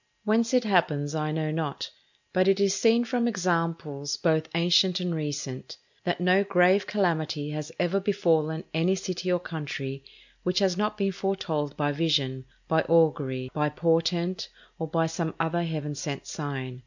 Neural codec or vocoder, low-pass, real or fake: none; 7.2 kHz; real